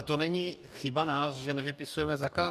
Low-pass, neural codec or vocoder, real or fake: 14.4 kHz; codec, 44.1 kHz, 2.6 kbps, DAC; fake